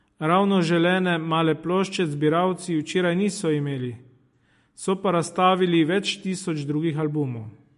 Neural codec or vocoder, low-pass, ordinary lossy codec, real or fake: none; 14.4 kHz; MP3, 48 kbps; real